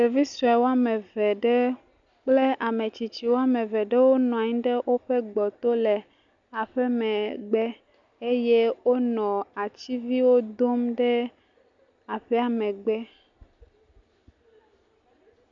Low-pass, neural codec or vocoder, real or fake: 7.2 kHz; none; real